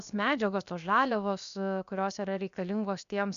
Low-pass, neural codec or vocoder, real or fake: 7.2 kHz; codec, 16 kHz, 0.7 kbps, FocalCodec; fake